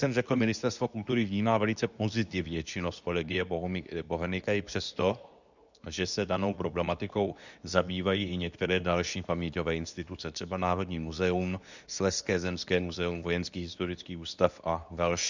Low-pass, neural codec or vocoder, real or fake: 7.2 kHz; codec, 24 kHz, 0.9 kbps, WavTokenizer, medium speech release version 2; fake